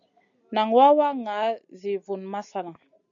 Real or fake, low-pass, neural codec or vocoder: real; 7.2 kHz; none